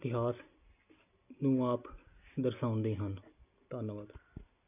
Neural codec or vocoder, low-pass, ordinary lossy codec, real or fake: none; 3.6 kHz; none; real